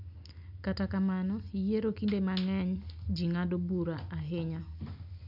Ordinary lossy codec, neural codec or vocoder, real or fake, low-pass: none; none; real; 5.4 kHz